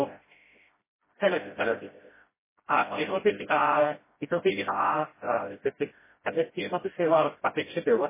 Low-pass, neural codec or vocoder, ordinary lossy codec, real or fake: 3.6 kHz; codec, 16 kHz, 0.5 kbps, FreqCodec, smaller model; MP3, 16 kbps; fake